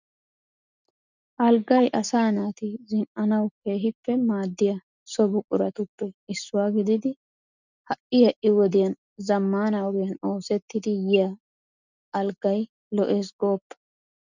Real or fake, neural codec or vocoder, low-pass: real; none; 7.2 kHz